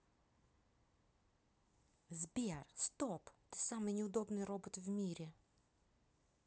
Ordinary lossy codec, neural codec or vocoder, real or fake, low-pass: none; none; real; none